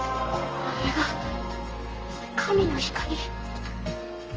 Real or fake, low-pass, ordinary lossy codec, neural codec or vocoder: fake; 7.2 kHz; Opus, 24 kbps; codec, 32 kHz, 1.9 kbps, SNAC